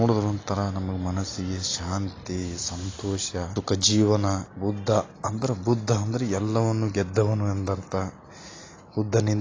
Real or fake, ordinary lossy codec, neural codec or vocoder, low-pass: real; AAC, 32 kbps; none; 7.2 kHz